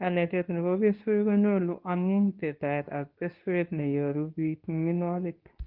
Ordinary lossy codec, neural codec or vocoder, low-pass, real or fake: Opus, 24 kbps; codec, 24 kHz, 0.9 kbps, WavTokenizer, medium speech release version 2; 5.4 kHz; fake